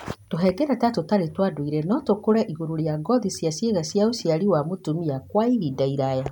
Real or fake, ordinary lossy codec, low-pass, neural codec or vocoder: real; none; 19.8 kHz; none